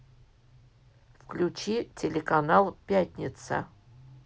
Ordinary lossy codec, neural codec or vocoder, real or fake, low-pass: none; none; real; none